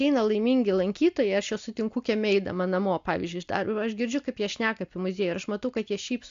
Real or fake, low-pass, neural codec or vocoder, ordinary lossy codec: real; 7.2 kHz; none; AAC, 64 kbps